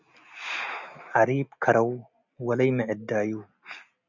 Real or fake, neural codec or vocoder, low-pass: real; none; 7.2 kHz